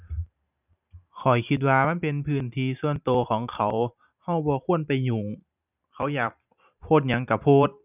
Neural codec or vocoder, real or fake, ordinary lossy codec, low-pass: vocoder, 44.1 kHz, 128 mel bands every 512 samples, BigVGAN v2; fake; none; 3.6 kHz